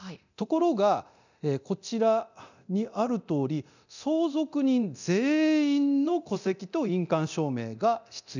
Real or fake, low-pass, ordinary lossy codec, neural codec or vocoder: fake; 7.2 kHz; none; codec, 24 kHz, 0.9 kbps, DualCodec